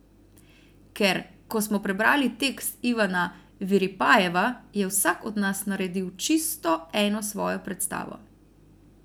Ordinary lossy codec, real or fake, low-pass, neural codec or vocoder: none; real; none; none